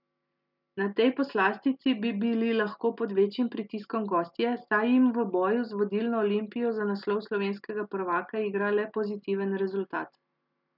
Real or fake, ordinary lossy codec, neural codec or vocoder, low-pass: real; none; none; 5.4 kHz